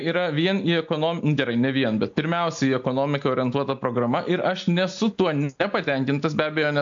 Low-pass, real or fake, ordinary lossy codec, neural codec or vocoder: 7.2 kHz; real; AAC, 64 kbps; none